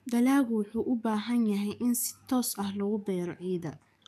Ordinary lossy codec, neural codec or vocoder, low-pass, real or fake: none; codec, 44.1 kHz, 7.8 kbps, DAC; 14.4 kHz; fake